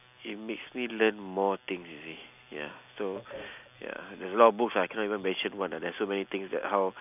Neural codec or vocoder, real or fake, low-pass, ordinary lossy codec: none; real; 3.6 kHz; none